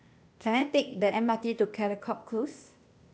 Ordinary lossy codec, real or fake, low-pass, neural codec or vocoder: none; fake; none; codec, 16 kHz, 0.8 kbps, ZipCodec